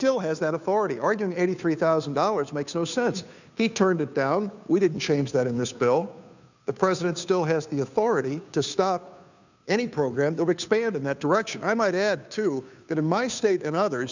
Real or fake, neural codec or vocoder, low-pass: fake; codec, 16 kHz, 2 kbps, FunCodec, trained on Chinese and English, 25 frames a second; 7.2 kHz